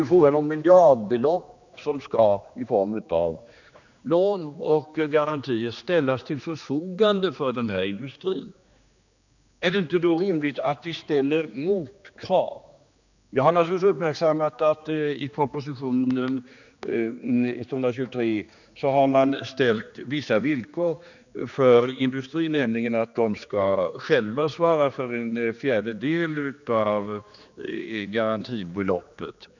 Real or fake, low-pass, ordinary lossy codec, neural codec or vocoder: fake; 7.2 kHz; none; codec, 16 kHz, 2 kbps, X-Codec, HuBERT features, trained on general audio